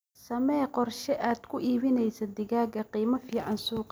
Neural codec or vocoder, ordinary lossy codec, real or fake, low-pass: none; none; real; none